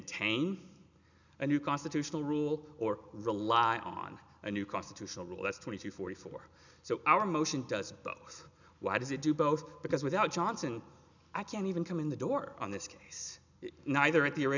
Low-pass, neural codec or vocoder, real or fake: 7.2 kHz; none; real